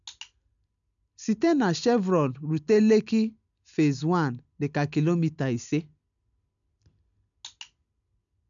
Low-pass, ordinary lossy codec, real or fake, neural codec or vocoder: 7.2 kHz; none; real; none